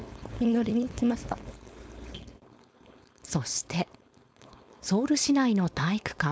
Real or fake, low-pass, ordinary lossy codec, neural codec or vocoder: fake; none; none; codec, 16 kHz, 4.8 kbps, FACodec